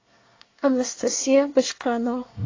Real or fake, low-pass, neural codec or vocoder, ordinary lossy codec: fake; 7.2 kHz; codec, 24 kHz, 1 kbps, SNAC; AAC, 32 kbps